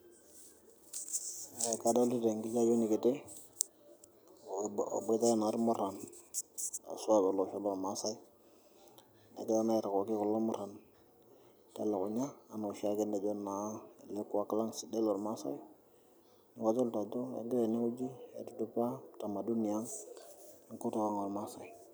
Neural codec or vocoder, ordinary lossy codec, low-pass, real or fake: none; none; none; real